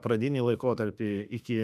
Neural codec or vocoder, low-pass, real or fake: autoencoder, 48 kHz, 32 numbers a frame, DAC-VAE, trained on Japanese speech; 14.4 kHz; fake